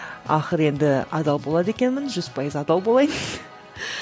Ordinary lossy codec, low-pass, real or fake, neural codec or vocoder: none; none; real; none